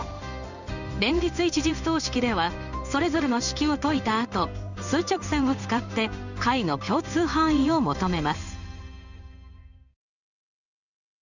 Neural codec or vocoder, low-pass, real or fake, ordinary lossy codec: codec, 16 kHz in and 24 kHz out, 1 kbps, XY-Tokenizer; 7.2 kHz; fake; none